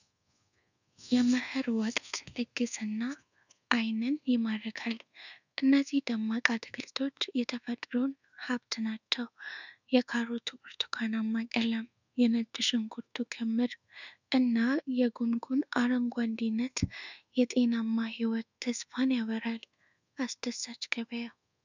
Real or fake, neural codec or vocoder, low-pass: fake; codec, 24 kHz, 1.2 kbps, DualCodec; 7.2 kHz